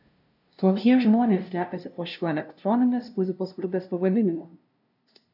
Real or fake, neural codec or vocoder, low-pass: fake; codec, 16 kHz, 0.5 kbps, FunCodec, trained on LibriTTS, 25 frames a second; 5.4 kHz